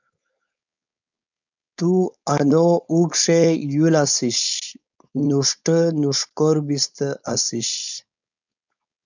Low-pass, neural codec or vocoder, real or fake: 7.2 kHz; codec, 16 kHz, 4.8 kbps, FACodec; fake